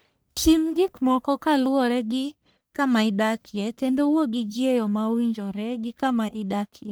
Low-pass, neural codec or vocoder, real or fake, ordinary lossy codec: none; codec, 44.1 kHz, 1.7 kbps, Pupu-Codec; fake; none